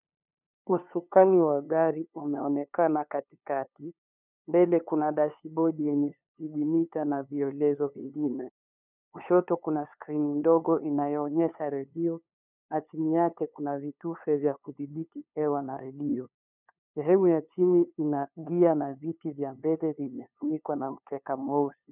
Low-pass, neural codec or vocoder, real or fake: 3.6 kHz; codec, 16 kHz, 2 kbps, FunCodec, trained on LibriTTS, 25 frames a second; fake